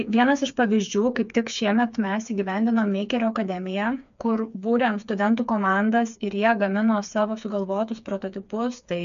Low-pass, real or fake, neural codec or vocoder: 7.2 kHz; fake; codec, 16 kHz, 4 kbps, FreqCodec, smaller model